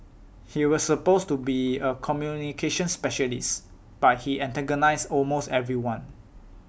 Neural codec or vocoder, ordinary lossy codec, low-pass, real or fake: none; none; none; real